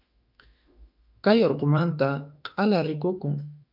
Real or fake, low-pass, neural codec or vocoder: fake; 5.4 kHz; autoencoder, 48 kHz, 32 numbers a frame, DAC-VAE, trained on Japanese speech